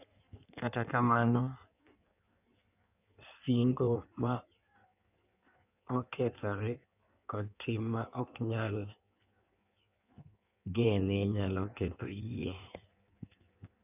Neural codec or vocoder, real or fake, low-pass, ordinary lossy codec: codec, 16 kHz in and 24 kHz out, 1.1 kbps, FireRedTTS-2 codec; fake; 3.6 kHz; none